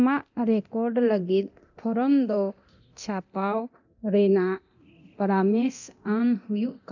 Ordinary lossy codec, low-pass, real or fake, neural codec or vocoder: none; 7.2 kHz; fake; codec, 24 kHz, 0.9 kbps, DualCodec